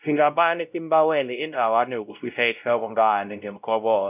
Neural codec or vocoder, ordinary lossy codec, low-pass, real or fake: codec, 16 kHz, 0.5 kbps, X-Codec, WavLM features, trained on Multilingual LibriSpeech; none; 3.6 kHz; fake